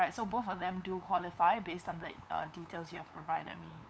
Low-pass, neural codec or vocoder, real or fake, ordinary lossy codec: none; codec, 16 kHz, 8 kbps, FunCodec, trained on LibriTTS, 25 frames a second; fake; none